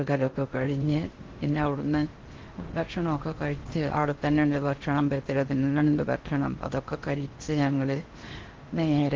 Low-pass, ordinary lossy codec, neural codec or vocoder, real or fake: 7.2 kHz; Opus, 16 kbps; codec, 16 kHz in and 24 kHz out, 0.6 kbps, FocalCodec, streaming, 4096 codes; fake